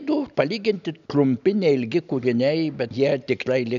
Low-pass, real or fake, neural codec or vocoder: 7.2 kHz; real; none